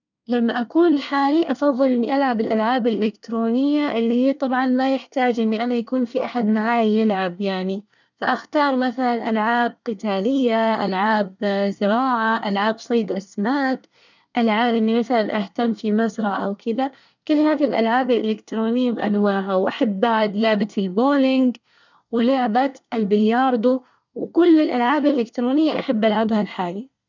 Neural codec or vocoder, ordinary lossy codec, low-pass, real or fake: codec, 24 kHz, 1 kbps, SNAC; none; 7.2 kHz; fake